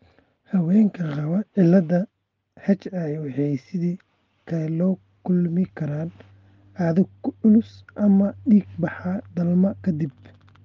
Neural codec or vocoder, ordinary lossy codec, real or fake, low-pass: none; Opus, 24 kbps; real; 7.2 kHz